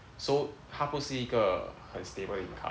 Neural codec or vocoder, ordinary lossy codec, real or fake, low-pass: none; none; real; none